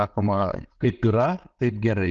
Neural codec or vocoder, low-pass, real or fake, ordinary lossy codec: none; 7.2 kHz; real; Opus, 16 kbps